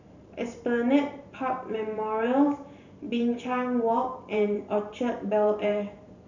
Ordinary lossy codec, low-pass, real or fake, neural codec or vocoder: none; 7.2 kHz; fake; vocoder, 44.1 kHz, 128 mel bands every 512 samples, BigVGAN v2